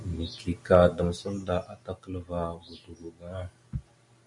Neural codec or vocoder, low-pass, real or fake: none; 10.8 kHz; real